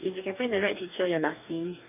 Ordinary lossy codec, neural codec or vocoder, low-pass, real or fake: none; codec, 44.1 kHz, 2.6 kbps, DAC; 3.6 kHz; fake